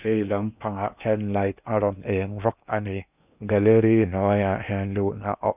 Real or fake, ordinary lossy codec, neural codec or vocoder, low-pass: fake; none; codec, 16 kHz in and 24 kHz out, 0.8 kbps, FocalCodec, streaming, 65536 codes; 3.6 kHz